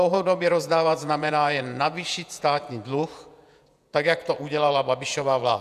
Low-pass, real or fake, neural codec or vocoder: 14.4 kHz; fake; vocoder, 48 kHz, 128 mel bands, Vocos